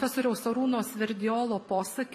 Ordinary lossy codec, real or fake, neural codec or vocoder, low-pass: MP3, 64 kbps; fake; vocoder, 44.1 kHz, 128 mel bands every 256 samples, BigVGAN v2; 14.4 kHz